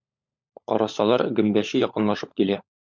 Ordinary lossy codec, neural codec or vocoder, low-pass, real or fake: MP3, 64 kbps; codec, 16 kHz, 16 kbps, FunCodec, trained on LibriTTS, 50 frames a second; 7.2 kHz; fake